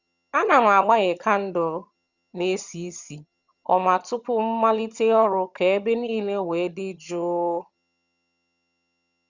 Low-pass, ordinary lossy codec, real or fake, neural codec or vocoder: 7.2 kHz; Opus, 64 kbps; fake; vocoder, 22.05 kHz, 80 mel bands, HiFi-GAN